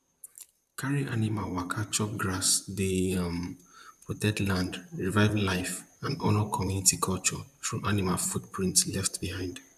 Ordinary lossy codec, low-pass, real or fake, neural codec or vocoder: none; 14.4 kHz; fake; vocoder, 44.1 kHz, 128 mel bands, Pupu-Vocoder